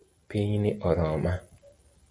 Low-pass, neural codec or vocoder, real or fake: 9.9 kHz; none; real